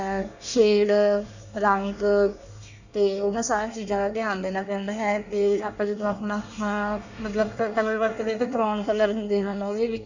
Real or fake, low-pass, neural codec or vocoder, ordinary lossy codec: fake; 7.2 kHz; codec, 24 kHz, 1 kbps, SNAC; none